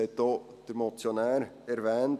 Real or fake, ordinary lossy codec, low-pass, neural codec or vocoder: real; none; 14.4 kHz; none